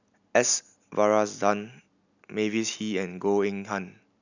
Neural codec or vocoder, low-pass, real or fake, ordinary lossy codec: none; 7.2 kHz; real; none